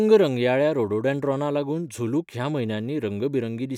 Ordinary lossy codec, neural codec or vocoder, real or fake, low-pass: none; none; real; 19.8 kHz